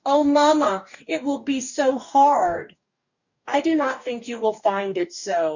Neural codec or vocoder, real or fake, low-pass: codec, 44.1 kHz, 2.6 kbps, DAC; fake; 7.2 kHz